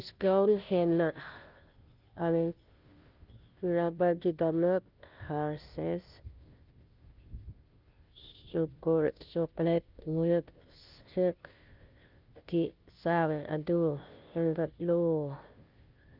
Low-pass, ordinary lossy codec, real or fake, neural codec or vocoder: 5.4 kHz; Opus, 32 kbps; fake; codec, 16 kHz, 0.5 kbps, FunCodec, trained on Chinese and English, 25 frames a second